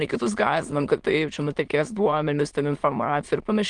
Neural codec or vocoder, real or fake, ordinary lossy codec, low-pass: autoencoder, 22.05 kHz, a latent of 192 numbers a frame, VITS, trained on many speakers; fake; Opus, 24 kbps; 9.9 kHz